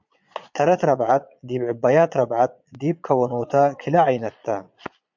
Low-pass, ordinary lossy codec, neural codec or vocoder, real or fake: 7.2 kHz; MP3, 64 kbps; vocoder, 22.05 kHz, 80 mel bands, Vocos; fake